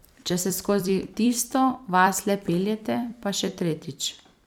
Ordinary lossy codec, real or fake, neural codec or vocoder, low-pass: none; fake; vocoder, 44.1 kHz, 128 mel bands every 256 samples, BigVGAN v2; none